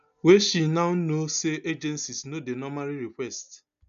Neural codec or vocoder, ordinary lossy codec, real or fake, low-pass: none; none; real; 7.2 kHz